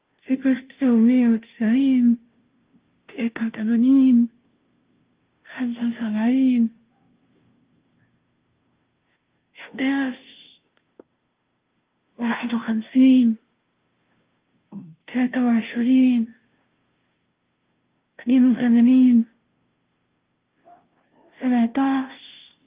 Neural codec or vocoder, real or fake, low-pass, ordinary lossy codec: codec, 16 kHz, 0.5 kbps, FunCodec, trained on Chinese and English, 25 frames a second; fake; 3.6 kHz; Opus, 32 kbps